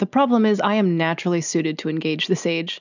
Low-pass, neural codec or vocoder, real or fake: 7.2 kHz; none; real